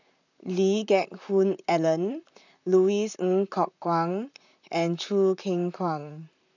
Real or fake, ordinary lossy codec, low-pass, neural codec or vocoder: fake; none; 7.2 kHz; vocoder, 44.1 kHz, 128 mel bands, Pupu-Vocoder